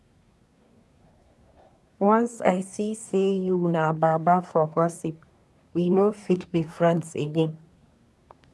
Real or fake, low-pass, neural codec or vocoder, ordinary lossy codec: fake; none; codec, 24 kHz, 1 kbps, SNAC; none